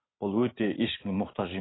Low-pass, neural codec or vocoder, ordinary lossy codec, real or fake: 7.2 kHz; none; AAC, 16 kbps; real